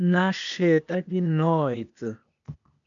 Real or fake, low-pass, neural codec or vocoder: fake; 7.2 kHz; codec, 16 kHz, 0.8 kbps, ZipCodec